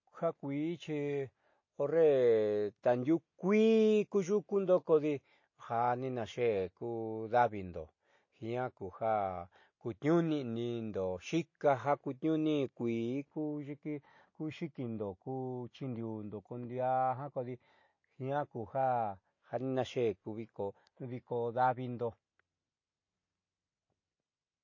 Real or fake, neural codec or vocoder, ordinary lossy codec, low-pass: real; none; MP3, 32 kbps; 7.2 kHz